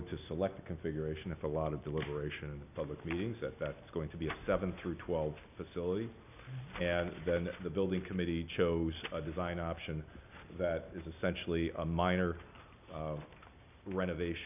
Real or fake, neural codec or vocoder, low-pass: real; none; 3.6 kHz